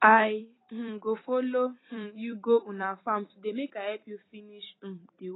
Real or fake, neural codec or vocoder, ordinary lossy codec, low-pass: fake; vocoder, 44.1 kHz, 128 mel bands every 256 samples, BigVGAN v2; AAC, 16 kbps; 7.2 kHz